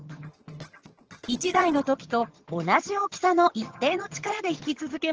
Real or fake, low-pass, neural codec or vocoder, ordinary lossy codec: fake; 7.2 kHz; vocoder, 22.05 kHz, 80 mel bands, HiFi-GAN; Opus, 16 kbps